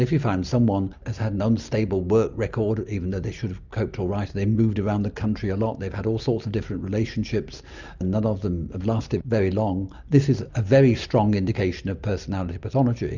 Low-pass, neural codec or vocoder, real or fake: 7.2 kHz; none; real